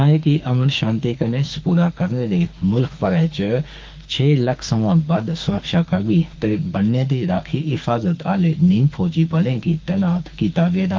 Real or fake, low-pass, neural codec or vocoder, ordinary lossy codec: fake; 7.2 kHz; autoencoder, 48 kHz, 32 numbers a frame, DAC-VAE, trained on Japanese speech; Opus, 32 kbps